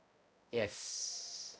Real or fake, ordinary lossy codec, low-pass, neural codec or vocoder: fake; none; none; codec, 16 kHz, 0.5 kbps, X-Codec, HuBERT features, trained on balanced general audio